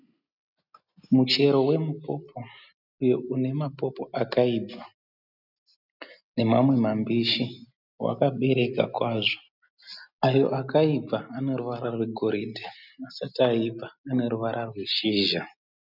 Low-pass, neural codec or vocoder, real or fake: 5.4 kHz; none; real